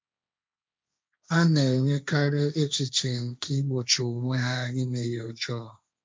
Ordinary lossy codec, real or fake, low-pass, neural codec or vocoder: none; fake; none; codec, 16 kHz, 1.1 kbps, Voila-Tokenizer